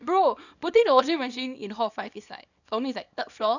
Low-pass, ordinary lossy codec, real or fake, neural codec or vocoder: 7.2 kHz; none; fake; codec, 24 kHz, 0.9 kbps, WavTokenizer, small release